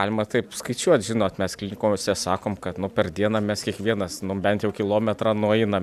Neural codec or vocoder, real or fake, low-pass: none; real; 14.4 kHz